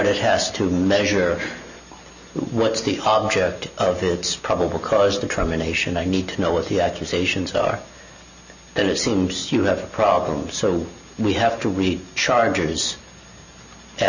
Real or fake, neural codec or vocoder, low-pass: real; none; 7.2 kHz